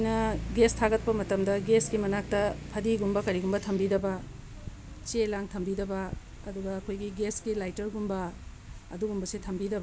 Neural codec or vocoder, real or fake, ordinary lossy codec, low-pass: none; real; none; none